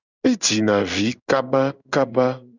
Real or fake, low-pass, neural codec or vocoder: fake; 7.2 kHz; codec, 16 kHz in and 24 kHz out, 1 kbps, XY-Tokenizer